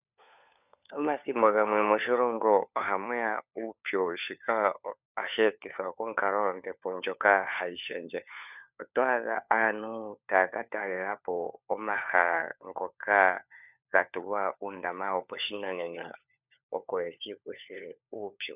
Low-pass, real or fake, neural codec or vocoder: 3.6 kHz; fake; codec, 16 kHz, 4 kbps, FunCodec, trained on LibriTTS, 50 frames a second